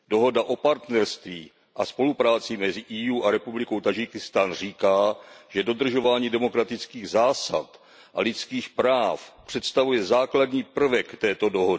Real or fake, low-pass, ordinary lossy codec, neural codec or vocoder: real; none; none; none